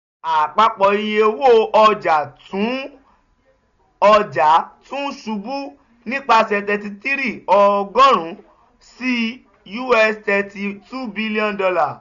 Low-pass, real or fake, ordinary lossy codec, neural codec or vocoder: 7.2 kHz; real; none; none